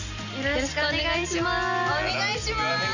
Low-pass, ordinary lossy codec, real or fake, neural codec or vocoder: 7.2 kHz; none; real; none